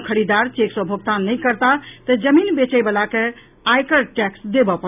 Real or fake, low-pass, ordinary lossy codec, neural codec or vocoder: real; 3.6 kHz; none; none